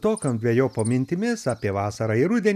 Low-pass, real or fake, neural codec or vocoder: 14.4 kHz; real; none